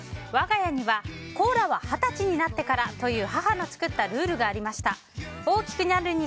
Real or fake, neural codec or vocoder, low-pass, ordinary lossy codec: real; none; none; none